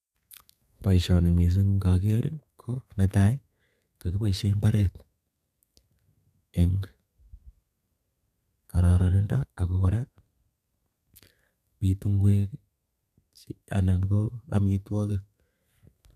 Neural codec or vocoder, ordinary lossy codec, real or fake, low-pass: codec, 32 kHz, 1.9 kbps, SNAC; none; fake; 14.4 kHz